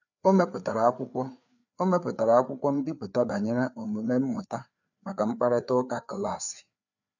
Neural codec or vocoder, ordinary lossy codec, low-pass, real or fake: codec, 16 kHz, 4 kbps, FreqCodec, larger model; none; 7.2 kHz; fake